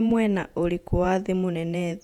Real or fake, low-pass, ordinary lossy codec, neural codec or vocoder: fake; 19.8 kHz; none; vocoder, 48 kHz, 128 mel bands, Vocos